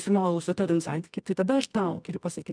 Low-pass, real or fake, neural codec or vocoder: 9.9 kHz; fake; codec, 24 kHz, 0.9 kbps, WavTokenizer, medium music audio release